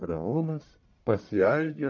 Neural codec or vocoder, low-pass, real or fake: codec, 44.1 kHz, 1.7 kbps, Pupu-Codec; 7.2 kHz; fake